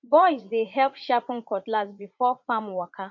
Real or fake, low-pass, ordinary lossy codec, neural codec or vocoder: fake; 7.2 kHz; MP3, 48 kbps; vocoder, 44.1 kHz, 80 mel bands, Vocos